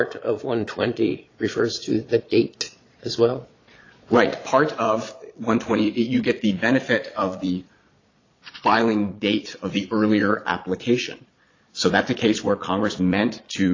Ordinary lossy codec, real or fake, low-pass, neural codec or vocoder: AAC, 32 kbps; fake; 7.2 kHz; vocoder, 22.05 kHz, 80 mel bands, Vocos